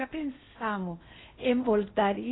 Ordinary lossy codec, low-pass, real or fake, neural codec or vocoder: AAC, 16 kbps; 7.2 kHz; fake; codec, 16 kHz in and 24 kHz out, 0.8 kbps, FocalCodec, streaming, 65536 codes